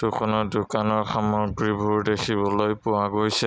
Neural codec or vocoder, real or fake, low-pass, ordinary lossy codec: none; real; none; none